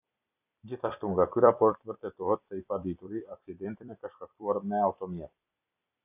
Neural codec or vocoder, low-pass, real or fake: vocoder, 44.1 kHz, 128 mel bands every 256 samples, BigVGAN v2; 3.6 kHz; fake